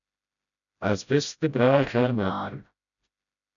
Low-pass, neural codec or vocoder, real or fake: 7.2 kHz; codec, 16 kHz, 0.5 kbps, FreqCodec, smaller model; fake